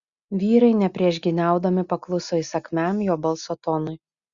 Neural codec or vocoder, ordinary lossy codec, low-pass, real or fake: none; Opus, 64 kbps; 7.2 kHz; real